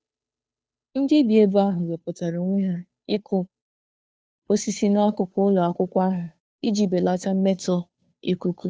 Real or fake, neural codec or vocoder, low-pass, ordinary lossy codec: fake; codec, 16 kHz, 2 kbps, FunCodec, trained on Chinese and English, 25 frames a second; none; none